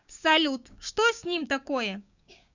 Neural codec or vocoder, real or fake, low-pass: codec, 16 kHz, 6 kbps, DAC; fake; 7.2 kHz